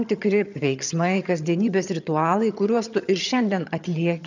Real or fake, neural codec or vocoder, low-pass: fake; vocoder, 22.05 kHz, 80 mel bands, HiFi-GAN; 7.2 kHz